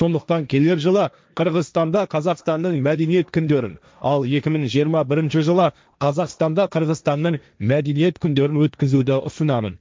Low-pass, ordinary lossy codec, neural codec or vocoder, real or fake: none; none; codec, 16 kHz, 1.1 kbps, Voila-Tokenizer; fake